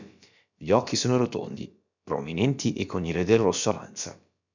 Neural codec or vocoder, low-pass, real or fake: codec, 16 kHz, about 1 kbps, DyCAST, with the encoder's durations; 7.2 kHz; fake